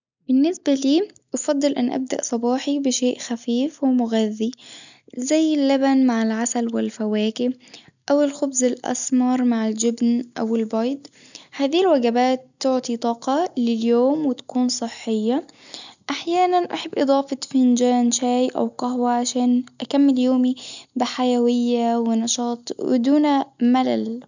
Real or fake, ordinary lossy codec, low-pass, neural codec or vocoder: real; none; 7.2 kHz; none